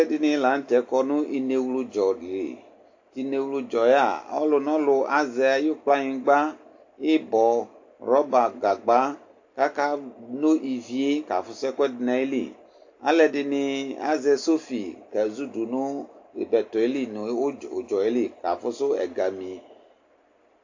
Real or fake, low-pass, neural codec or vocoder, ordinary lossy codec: real; 7.2 kHz; none; AAC, 48 kbps